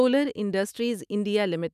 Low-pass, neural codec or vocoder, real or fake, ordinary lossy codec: 14.4 kHz; autoencoder, 48 kHz, 128 numbers a frame, DAC-VAE, trained on Japanese speech; fake; none